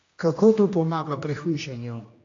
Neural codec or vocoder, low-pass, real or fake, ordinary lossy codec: codec, 16 kHz, 1 kbps, X-Codec, HuBERT features, trained on general audio; 7.2 kHz; fake; AAC, 48 kbps